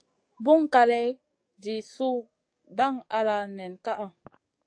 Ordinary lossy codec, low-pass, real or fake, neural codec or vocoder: AAC, 48 kbps; 9.9 kHz; fake; codec, 44.1 kHz, 7.8 kbps, DAC